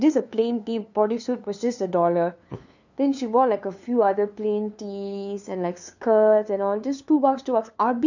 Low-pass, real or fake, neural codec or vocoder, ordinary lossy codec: 7.2 kHz; fake; codec, 16 kHz, 2 kbps, FunCodec, trained on LibriTTS, 25 frames a second; none